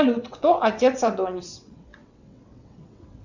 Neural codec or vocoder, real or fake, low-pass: vocoder, 22.05 kHz, 80 mel bands, WaveNeXt; fake; 7.2 kHz